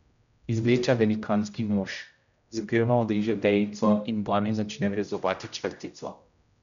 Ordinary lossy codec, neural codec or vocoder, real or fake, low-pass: none; codec, 16 kHz, 0.5 kbps, X-Codec, HuBERT features, trained on general audio; fake; 7.2 kHz